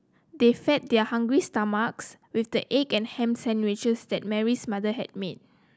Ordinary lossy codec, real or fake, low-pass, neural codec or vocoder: none; real; none; none